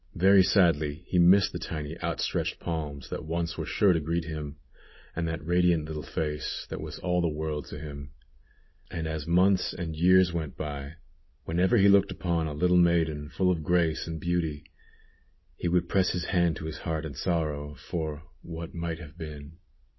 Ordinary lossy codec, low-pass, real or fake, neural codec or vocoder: MP3, 24 kbps; 7.2 kHz; real; none